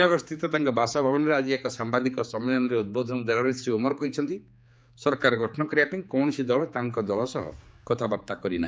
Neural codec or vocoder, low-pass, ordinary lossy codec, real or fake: codec, 16 kHz, 4 kbps, X-Codec, HuBERT features, trained on general audio; none; none; fake